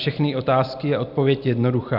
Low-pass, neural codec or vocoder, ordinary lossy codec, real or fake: 5.4 kHz; none; AAC, 48 kbps; real